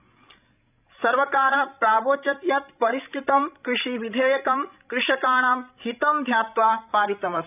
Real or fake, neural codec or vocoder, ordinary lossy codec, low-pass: fake; codec, 16 kHz, 16 kbps, FreqCodec, larger model; none; 3.6 kHz